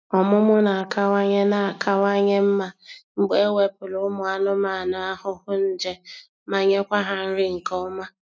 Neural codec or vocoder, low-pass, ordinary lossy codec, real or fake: none; none; none; real